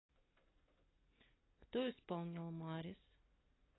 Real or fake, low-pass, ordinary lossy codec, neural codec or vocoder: real; 7.2 kHz; AAC, 16 kbps; none